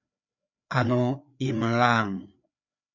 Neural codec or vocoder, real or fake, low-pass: codec, 16 kHz, 4 kbps, FreqCodec, larger model; fake; 7.2 kHz